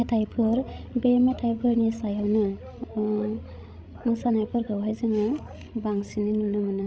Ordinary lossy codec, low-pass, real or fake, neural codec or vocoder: none; none; fake; codec, 16 kHz, 16 kbps, FreqCodec, larger model